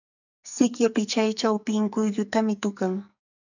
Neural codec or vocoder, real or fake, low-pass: codec, 44.1 kHz, 2.6 kbps, SNAC; fake; 7.2 kHz